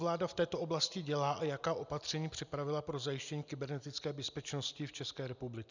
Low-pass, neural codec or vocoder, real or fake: 7.2 kHz; none; real